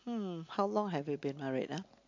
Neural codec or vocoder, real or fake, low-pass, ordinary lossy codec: none; real; 7.2 kHz; MP3, 48 kbps